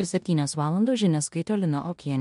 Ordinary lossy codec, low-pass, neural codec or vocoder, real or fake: MP3, 64 kbps; 10.8 kHz; codec, 16 kHz in and 24 kHz out, 0.9 kbps, LongCat-Audio-Codec, four codebook decoder; fake